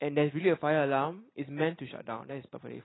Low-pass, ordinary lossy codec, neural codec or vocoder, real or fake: 7.2 kHz; AAC, 16 kbps; none; real